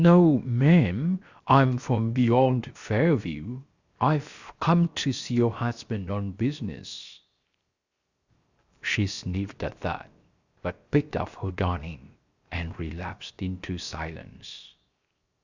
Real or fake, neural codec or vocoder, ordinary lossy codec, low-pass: fake; codec, 16 kHz, 0.7 kbps, FocalCodec; Opus, 64 kbps; 7.2 kHz